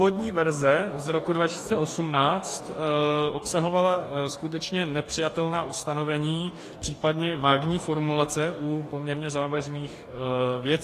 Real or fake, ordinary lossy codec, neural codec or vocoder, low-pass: fake; AAC, 48 kbps; codec, 44.1 kHz, 2.6 kbps, DAC; 14.4 kHz